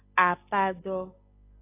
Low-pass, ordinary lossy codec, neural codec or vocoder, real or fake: 3.6 kHz; AAC, 24 kbps; none; real